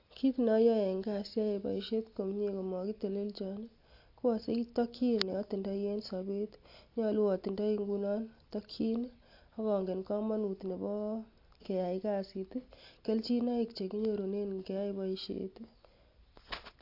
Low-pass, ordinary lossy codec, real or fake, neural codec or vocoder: 5.4 kHz; AAC, 32 kbps; real; none